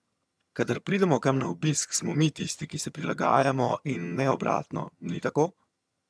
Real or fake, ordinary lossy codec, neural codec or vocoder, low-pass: fake; none; vocoder, 22.05 kHz, 80 mel bands, HiFi-GAN; none